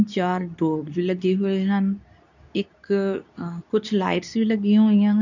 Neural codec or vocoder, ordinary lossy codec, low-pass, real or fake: codec, 24 kHz, 0.9 kbps, WavTokenizer, medium speech release version 2; none; 7.2 kHz; fake